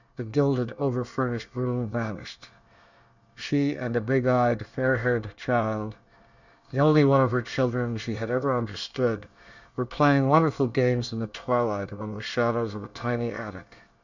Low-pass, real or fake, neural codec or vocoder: 7.2 kHz; fake; codec, 24 kHz, 1 kbps, SNAC